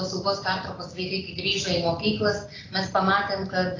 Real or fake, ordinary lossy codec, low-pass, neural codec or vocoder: real; AAC, 32 kbps; 7.2 kHz; none